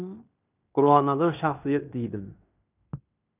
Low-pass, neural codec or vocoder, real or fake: 3.6 kHz; codec, 16 kHz in and 24 kHz out, 0.9 kbps, LongCat-Audio-Codec, fine tuned four codebook decoder; fake